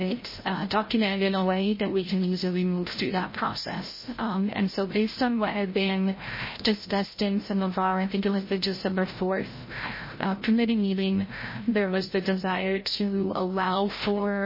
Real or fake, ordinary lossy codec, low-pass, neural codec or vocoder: fake; MP3, 24 kbps; 5.4 kHz; codec, 16 kHz, 0.5 kbps, FreqCodec, larger model